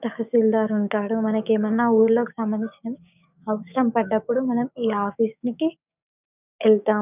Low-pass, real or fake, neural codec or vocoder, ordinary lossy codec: 3.6 kHz; fake; codec, 16 kHz, 6 kbps, DAC; none